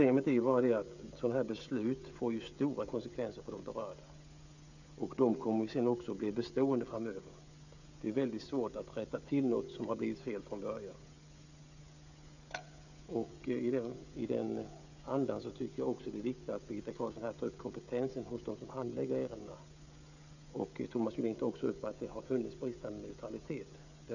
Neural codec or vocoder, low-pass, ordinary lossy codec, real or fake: codec, 16 kHz, 16 kbps, FreqCodec, smaller model; 7.2 kHz; none; fake